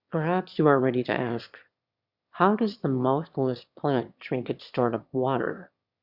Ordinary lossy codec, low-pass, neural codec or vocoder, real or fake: Opus, 64 kbps; 5.4 kHz; autoencoder, 22.05 kHz, a latent of 192 numbers a frame, VITS, trained on one speaker; fake